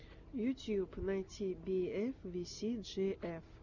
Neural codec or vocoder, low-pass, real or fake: none; 7.2 kHz; real